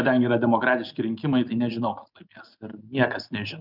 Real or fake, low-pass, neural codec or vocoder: real; 5.4 kHz; none